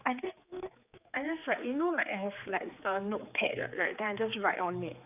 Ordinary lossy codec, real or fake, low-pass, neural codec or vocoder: none; fake; 3.6 kHz; codec, 16 kHz, 4 kbps, X-Codec, HuBERT features, trained on general audio